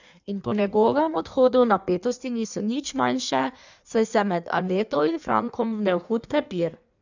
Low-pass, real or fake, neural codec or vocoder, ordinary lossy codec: 7.2 kHz; fake; codec, 16 kHz in and 24 kHz out, 1.1 kbps, FireRedTTS-2 codec; none